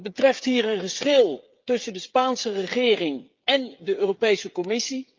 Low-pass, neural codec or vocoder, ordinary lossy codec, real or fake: 7.2 kHz; codec, 16 kHz, 8 kbps, FreqCodec, smaller model; Opus, 24 kbps; fake